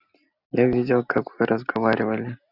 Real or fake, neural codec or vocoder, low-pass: real; none; 5.4 kHz